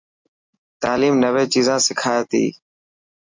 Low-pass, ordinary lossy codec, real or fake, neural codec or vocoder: 7.2 kHz; MP3, 64 kbps; real; none